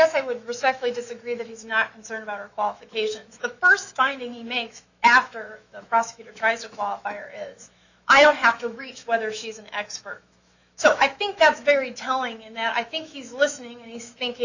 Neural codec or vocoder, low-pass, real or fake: autoencoder, 48 kHz, 128 numbers a frame, DAC-VAE, trained on Japanese speech; 7.2 kHz; fake